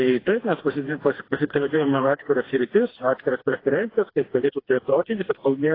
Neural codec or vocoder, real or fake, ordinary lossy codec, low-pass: codec, 16 kHz, 2 kbps, FreqCodec, smaller model; fake; AAC, 24 kbps; 5.4 kHz